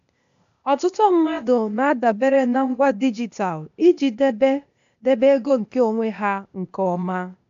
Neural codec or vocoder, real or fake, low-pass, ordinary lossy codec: codec, 16 kHz, 0.8 kbps, ZipCodec; fake; 7.2 kHz; AAC, 96 kbps